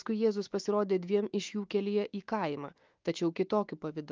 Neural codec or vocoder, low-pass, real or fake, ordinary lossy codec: autoencoder, 48 kHz, 128 numbers a frame, DAC-VAE, trained on Japanese speech; 7.2 kHz; fake; Opus, 32 kbps